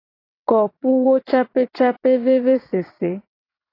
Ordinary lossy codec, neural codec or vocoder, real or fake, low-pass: AAC, 24 kbps; vocoder, 22.05 kHz, 80 mel bands, WaveNeXt; fake; 5.4 kHz